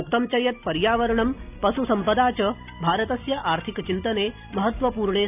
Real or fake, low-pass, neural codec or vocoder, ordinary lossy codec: real; 3.6 kHz; none; none